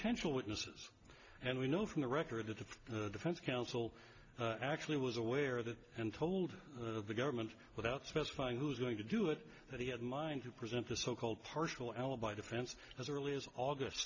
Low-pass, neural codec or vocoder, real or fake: 7.2 kHz; none; real